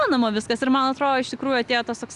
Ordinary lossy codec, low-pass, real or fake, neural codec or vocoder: Opus, 64 kbps; 10.8 kHz; real; none